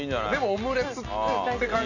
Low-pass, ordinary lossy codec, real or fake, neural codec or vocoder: 7.2 kHz; none; real; none